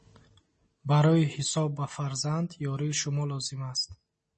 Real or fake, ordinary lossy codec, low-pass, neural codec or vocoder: real; MP3, 32 kbps; 10.8 kHz; none